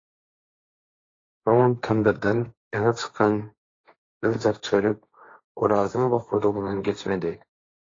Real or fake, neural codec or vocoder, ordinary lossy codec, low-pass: fake; codec, 16 kHz, 1.1 kbps, Voila-Tokenizer; AAC, 32 kbps; 7.2 kHz